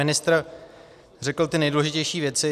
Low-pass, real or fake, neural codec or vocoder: 14.4 kHz; real; none